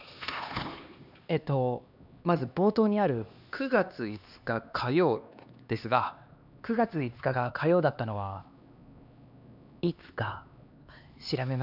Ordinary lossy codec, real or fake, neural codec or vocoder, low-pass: none; fake; codec, 16 kHz, 2 kbps, X-Codec, HuBERT features, trained on LibriSpeech; 5.4 kHz